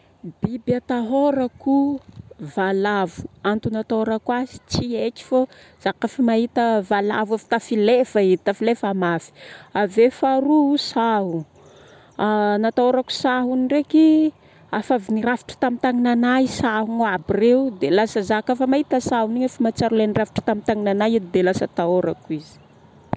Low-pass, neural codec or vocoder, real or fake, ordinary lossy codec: none; none; real; none